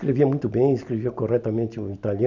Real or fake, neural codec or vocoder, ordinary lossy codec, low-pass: real; none; none; 7.2 kHz